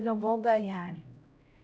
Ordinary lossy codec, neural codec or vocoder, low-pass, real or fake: none; codec, 16 kHz, 0.5 kbps, X-Codec, HuBERT features, trained on LibriSpeech; none; fake